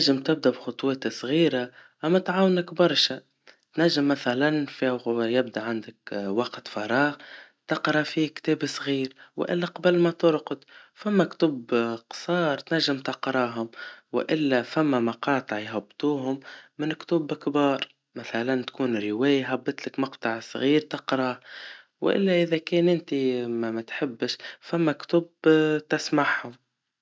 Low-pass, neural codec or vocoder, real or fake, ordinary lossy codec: none; none; real; none